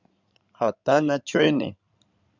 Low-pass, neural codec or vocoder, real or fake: 7.2 kHz; codec, 16 kHz in and 24 kHz out, 2.2 kbps, FireRedTTS-2 codec; fake